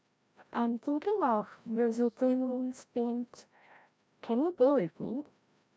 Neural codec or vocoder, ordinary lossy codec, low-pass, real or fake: codec, 16 kHz, 0.5 kbps, FreqCodec, larger model; none; none; fake